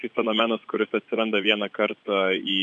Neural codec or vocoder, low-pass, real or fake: vocoder, 24 kHz, 100 mel bands, Vocos; 10.8 kHz; fake